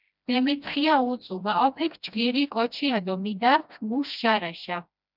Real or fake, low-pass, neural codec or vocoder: fake; 5.4 kHz; codec, 16 kHz, 1 kbps, FreqCodec, smaller model